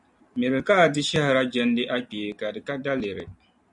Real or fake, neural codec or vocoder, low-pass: real; none; 10.8 kHz